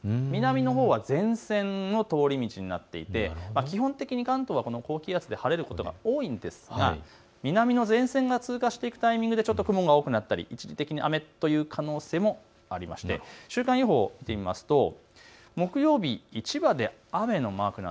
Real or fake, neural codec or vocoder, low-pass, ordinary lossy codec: real; none; none; none